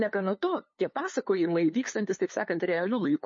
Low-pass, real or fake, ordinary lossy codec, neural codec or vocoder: 7.2 kHz; fake; MP3, 32 kbps; codec, 16 kHz, 2 kbps, FunCodec, trained on LibriTTS, 25 frames a second